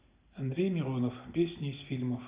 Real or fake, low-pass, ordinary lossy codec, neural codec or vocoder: real; 3.6 kHz; Opus, 64 kbps; none